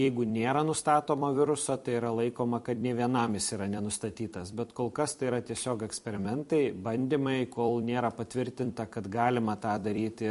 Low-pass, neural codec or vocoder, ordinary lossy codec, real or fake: 14.4 kHz; vocoder, 44.1 kHz, 128 mel bands every 256 samples, BigVGAN v2; MP3, 48 kbps; fake